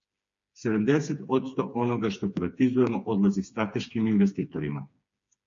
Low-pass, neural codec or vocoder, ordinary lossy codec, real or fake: 7.2 kHz; codec, 16 kHz, 4 kbps, FreqCodec, smaller model; MP3, 48 kbps; fake